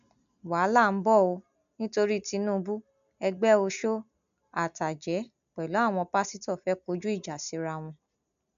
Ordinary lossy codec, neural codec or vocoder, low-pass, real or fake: MP3, 64 kbps; none; 7.2 kHz; real